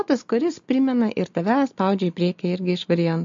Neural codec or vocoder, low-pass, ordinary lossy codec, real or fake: none; 7.2 kHz; MP3, 48 kbps; real